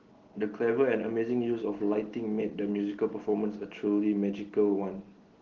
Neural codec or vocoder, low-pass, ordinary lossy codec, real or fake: none; 7.2 kHz; Opus, 16 kbps; real